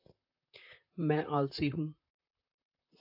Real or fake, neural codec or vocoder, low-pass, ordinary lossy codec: fake; vocoder, 44.1 kHz, 128 mel bands, Pupu-Vocoder; 5.4 kHz; AAC, 48 kbps